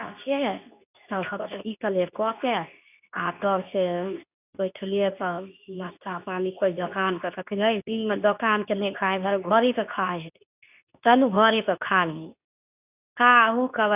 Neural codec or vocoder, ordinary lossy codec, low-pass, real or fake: codec, 24 kHz, 0.9 kbps, WavTokenizer, medium speech release version 2; none; 3.6 kHz; fake